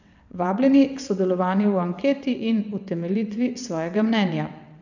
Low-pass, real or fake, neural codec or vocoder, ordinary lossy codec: 7.2 kHz; fake; vocoder, 22.05 kHz, 80 mel bands, WaveNeXt; none